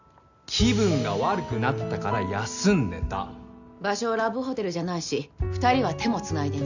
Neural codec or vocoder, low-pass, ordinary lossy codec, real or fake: none; 7.2 kHz; none; real